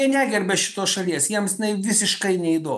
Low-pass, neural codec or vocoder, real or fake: 14.4 kHz; none; real